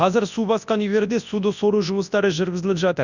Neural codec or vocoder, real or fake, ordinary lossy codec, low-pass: codec, 24 kHz, 0.9 kbps, WavTokenizer, large speech release; fake; none; 7.2 kHz